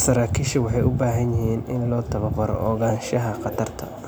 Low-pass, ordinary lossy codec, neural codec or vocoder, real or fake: none; none; none; real